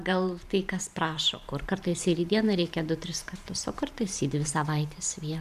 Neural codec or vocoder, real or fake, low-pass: vocoder, 44.1 kHz, 128 mel bands every 512 samples, BigVGAN v2; fake; 14.4 kHz